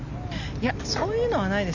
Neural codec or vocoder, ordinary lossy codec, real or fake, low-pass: none; none; real; 7.2 kHz